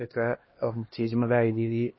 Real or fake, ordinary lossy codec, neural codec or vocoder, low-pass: fake; MP3, 24 kbps; codec, 16 kHz, 1 kbps, X-Codec, HuBERT features, trained on LibriSpeech; 7.2 kHz